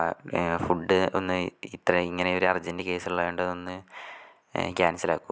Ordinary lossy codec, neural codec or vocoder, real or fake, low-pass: none; none; real; none